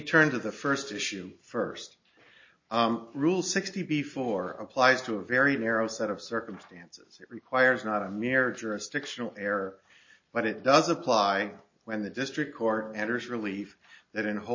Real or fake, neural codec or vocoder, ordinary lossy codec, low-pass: real; none; MP3, 32 kbps; 7.2 kHz